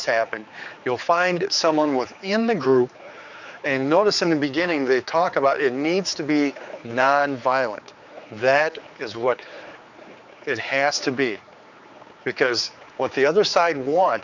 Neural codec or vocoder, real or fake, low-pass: codec, 16 kHz, 4 kbps, X-Codec, HuBERT features, trained on general audio; fake; 7.2 kHz